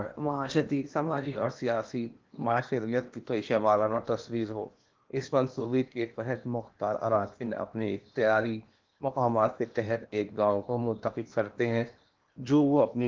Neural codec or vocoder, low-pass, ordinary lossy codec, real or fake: codec, 16 kHz in and 24 kHz out, 0.8 kbps, FocalCodec, streaming, 65536 codes; 7.2 kHz; Opus, 24 kbps; fake